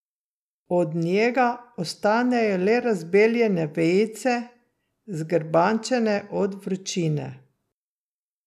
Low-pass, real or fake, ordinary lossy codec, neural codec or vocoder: 14.4 kHz; real; none; none